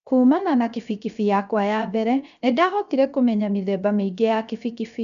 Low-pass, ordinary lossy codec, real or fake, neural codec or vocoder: 7.2 kHz; none; fake; codec, 16 kHz, 0.7 kbps, FocalCodec